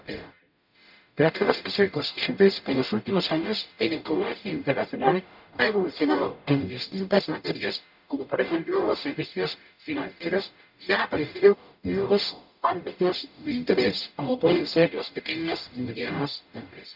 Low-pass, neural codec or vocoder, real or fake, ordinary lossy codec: 5.4 kHz; codec, 44.1 kHz, 0.9 kbps, DAC; fake; none